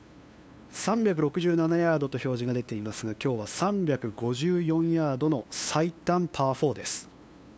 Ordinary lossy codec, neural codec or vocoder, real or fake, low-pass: none; codec, 16 kHz, 2 kbps, FunCodec, trained on LibriTTS, 25 frames a second; fake; none